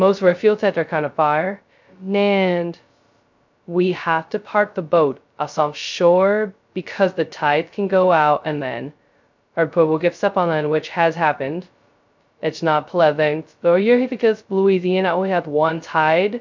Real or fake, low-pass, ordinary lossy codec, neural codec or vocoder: fake; 7.2 kHz; MP3, 64 kbps; codec, 16 kHz, 0.2 kbps, FocalCodec